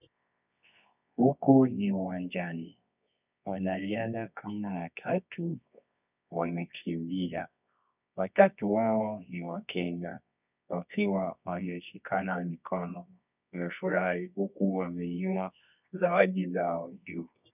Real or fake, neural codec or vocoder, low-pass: fake; codec, 24 kHz, 0.9 kbps, WavTokenizer, medium music audio release; 3.6 kHz